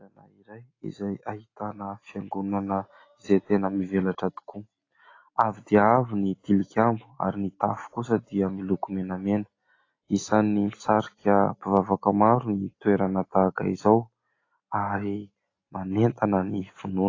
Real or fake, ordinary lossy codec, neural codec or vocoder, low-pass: real; AAC, 32 kbps; none; 7.2 kHz